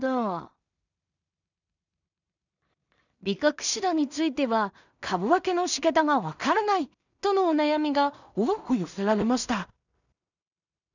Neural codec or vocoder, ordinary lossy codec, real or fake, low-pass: codec, 16 kHz in and 24 kHz out, 0.4 kbps, LongCat-Audio-Codec, two codebook decoder; none; fake; 7.2 kHz